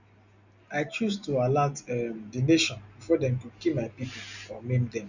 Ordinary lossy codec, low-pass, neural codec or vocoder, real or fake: none; 7.2 kHz; none; real